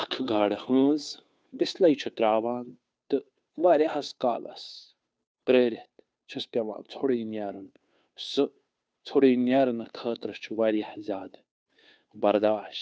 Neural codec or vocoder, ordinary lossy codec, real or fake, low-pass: codec, 16 kHz, 2 kbps, FunCodec, trained on Chinese and English, 25 frames a second; none; fake; none